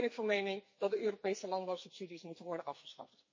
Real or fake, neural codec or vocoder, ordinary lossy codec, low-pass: fake; codec, 44.1 kHz, 2.6 kbps, SNAC; MP3, 32 kbps; 7.2 kHz